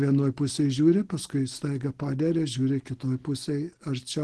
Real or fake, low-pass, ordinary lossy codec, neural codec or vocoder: real; 10.8 kHz; Opus, 16 kbps; none